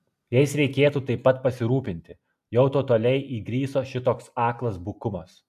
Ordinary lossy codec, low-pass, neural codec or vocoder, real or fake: AAC, 96 kbps; 14.4 kHz; none; real